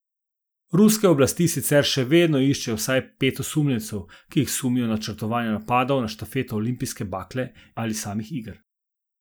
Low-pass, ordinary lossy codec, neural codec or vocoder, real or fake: none; none; none; real